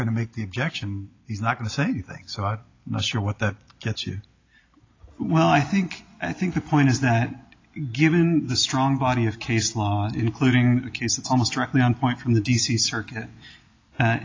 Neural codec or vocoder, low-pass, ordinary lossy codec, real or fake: none; 7.2 kHz; AAC, 32 kbps; real